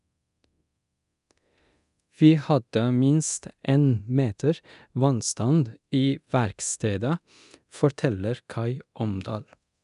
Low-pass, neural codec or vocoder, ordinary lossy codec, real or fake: 10.8 kHz; codec, 24 kHz, 0.9 kbps, DualCodec; none; fake